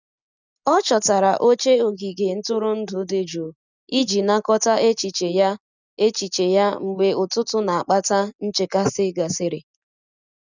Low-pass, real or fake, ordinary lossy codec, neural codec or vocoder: 7.2 kHz; real; none; none